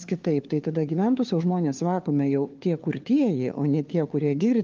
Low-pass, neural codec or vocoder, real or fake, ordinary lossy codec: 7.2 kHz; codec, 16 kHz, 4 kbps, FunCodec, trained on LibriTTS, 50 frames a second; fake; Opus, 24 kbps